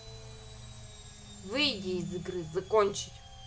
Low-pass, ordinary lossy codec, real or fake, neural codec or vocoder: none; none; real; none